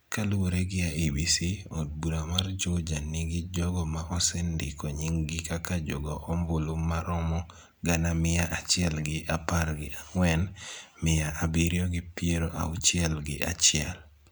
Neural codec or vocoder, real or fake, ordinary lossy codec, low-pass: none; real; none; none